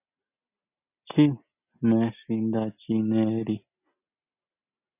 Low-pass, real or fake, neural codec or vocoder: 3.6 kHz; real; none